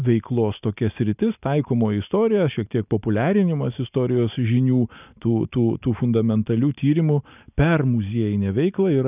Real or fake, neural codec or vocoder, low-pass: real; none; 3.6 kHz